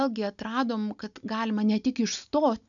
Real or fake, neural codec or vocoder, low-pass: real; none; 7.2 kHz